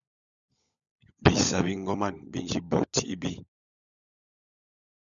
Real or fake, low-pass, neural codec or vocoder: fake; 7.2 kHz; codec, 16 kHz, 16 kbps, FunCodec, trained on LibriTTS, 50 frames a second